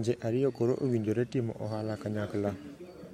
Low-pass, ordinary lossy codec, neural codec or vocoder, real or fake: 19.8 kHz; MP3, 64 kbps; vocoder, 44.1 kHz, 128 mel bands every 512 samples, BigVGAN v2; fake